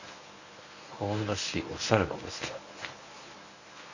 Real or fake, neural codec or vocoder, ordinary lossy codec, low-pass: fake; codec, 24 kHz, 0.9 kbps, WavTokenizer, medium speech release version 1; none; 7.2 kHz